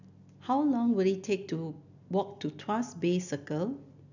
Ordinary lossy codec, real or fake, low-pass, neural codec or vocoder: none; real; 7.2 kHz; none